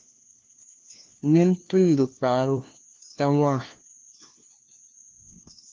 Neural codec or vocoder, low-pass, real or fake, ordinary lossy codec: codec, 16 kHz, 1 kbps, FunCodec, trained on LibriTTS, 50 frames a second; 7.2 kHz; fake; Opus, 24 kbps